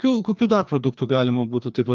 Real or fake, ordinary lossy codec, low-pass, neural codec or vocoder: fake; Opus, 24 kbps; 7.2 kHz; codec, 16 kHz, 2 kbps, FreqCodec, larger model